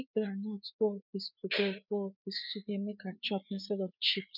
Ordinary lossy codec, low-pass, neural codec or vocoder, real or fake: none; 5.4 kHz; codec, 16 kHz, 4 kbps, FreqCodec, larger model; fake